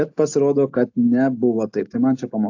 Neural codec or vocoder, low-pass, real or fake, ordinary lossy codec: none; 7.2 kHz; real; AAC, 48 kbps